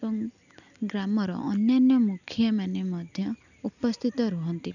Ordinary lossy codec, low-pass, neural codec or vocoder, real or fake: none; 7.2 kHz; none; real